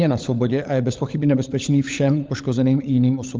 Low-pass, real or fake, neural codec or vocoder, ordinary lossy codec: 7.2 kHz; fake; codec, 16 kHz, 16 kbps, FunCodec, trained on LibriTTS, 50 frames a second; Opus, 24 kbps